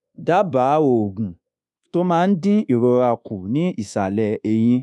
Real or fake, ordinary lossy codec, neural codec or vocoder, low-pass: fake; none; codec, 24 kHz, 1.2 kbps, DualCodec; none